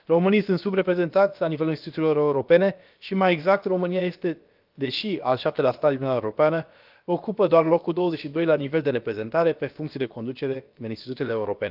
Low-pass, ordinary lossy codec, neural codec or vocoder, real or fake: 5.4 kHz; Opus, 32 kbps; codec, 16 kHz, about 1 kbps, DyCAST, with the encoder's durations; fake